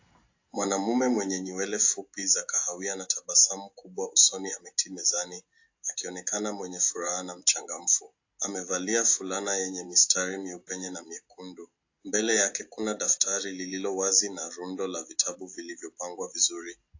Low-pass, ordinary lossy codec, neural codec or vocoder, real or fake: 7.2 kHz; AAC, 48 kbps; none; real